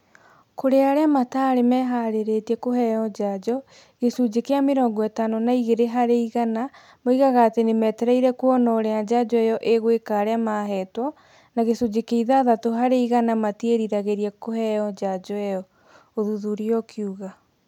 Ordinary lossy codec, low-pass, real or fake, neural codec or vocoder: none; 19.8 kHz; real; none